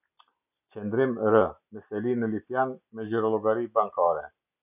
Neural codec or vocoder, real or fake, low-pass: none; real; 3.6 kHz